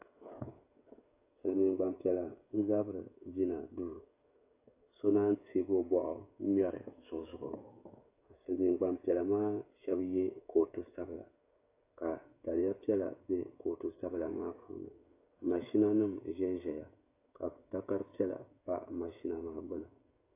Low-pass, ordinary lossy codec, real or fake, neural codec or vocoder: 3.6 kHz; AAC, 24 kbps; fake; codec, 16 kHz, 16 kbps, FreqCodec, smaller model